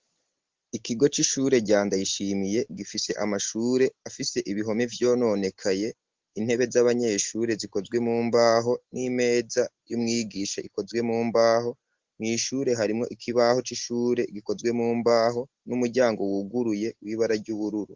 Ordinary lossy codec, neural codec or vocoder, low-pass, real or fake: Opus, 16 kbps; none; 7.2 kHz; real